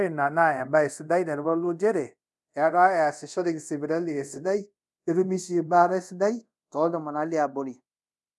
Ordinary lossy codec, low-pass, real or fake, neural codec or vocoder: none; none; fake; codec, 24 kHz, 0.5 kbps, DualCodec